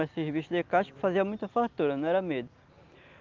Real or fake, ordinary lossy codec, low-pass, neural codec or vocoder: real; Opus, 32 kbps; 7.2 kHz; none